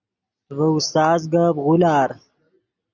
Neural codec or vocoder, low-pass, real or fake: none; 7.2 kHz; real